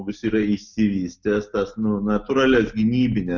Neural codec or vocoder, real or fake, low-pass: none; real; 7.2 kHz